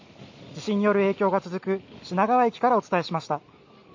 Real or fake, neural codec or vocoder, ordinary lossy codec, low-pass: real; none; MP3, 48 kbps; 7.2 kHz